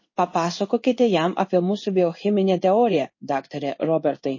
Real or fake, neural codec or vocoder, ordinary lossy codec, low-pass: fake; codec, 16 kHz in and 24 kHz out, 1 kbps, XY-Tokenizer; MP3, 32 kbps; 7.2 kHz